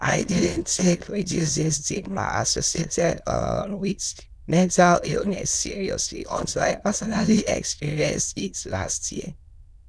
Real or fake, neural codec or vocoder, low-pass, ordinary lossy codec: fake; autoencoder, 22.05 kHz, a latent of 192 numbers a frame, VITS, trained on many speakers; none; none